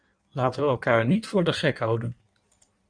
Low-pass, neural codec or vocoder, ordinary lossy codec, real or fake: 9.9 kHz; codec, 16 kHz in and 24 kHz out, 1.1 kbps, FireRedTTS-2 codec; Opus, 64 kbps; fake